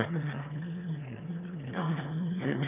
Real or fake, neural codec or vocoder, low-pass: fake; autoencoder, 22.05 kHz, a latent of 192 numbers a frame, VITS, trained on one speaker; 3.6 kHz